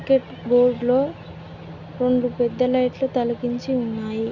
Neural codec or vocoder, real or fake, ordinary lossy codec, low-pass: none; real; none; 7.2 kHz